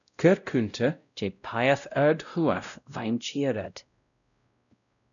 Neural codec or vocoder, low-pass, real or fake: codec, 16 kHz, 0.5 kbps, X-Codec, WavLM features, trained on Multilingual LibriSpeech; 7.2 kHz; fake